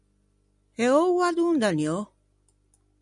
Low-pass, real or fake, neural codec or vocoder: 10.8 kHz; real; none